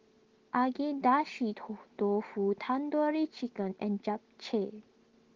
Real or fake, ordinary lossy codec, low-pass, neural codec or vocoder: real; Opus, 16 kbps; 7.2 kHz; none